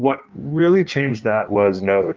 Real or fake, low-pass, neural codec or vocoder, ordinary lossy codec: fake; 7.2 kHz; codec, 16 kHz in and 24 kHz out, 1.1 kbps, FireRedTTS-2 codec; Opus, 24 kbps